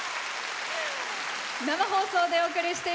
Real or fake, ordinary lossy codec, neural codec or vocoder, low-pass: real; none; none; none